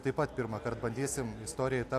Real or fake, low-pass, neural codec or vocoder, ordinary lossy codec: real; 14.4 kHz; none; AAC, 64 kbps